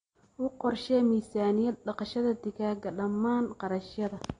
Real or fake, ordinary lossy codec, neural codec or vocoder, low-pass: real; AAC, 32 kbps; none; 9.9 kHz